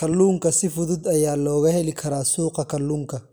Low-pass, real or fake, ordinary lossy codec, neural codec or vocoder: none; real; none; none